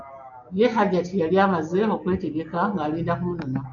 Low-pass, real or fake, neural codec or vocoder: 7.2 kHz; real; none